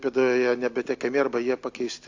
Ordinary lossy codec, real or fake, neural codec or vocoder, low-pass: AAC, 48 kbps; real; none; 7.2 kHz